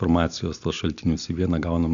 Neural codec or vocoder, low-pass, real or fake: none; 7.2 kHz; real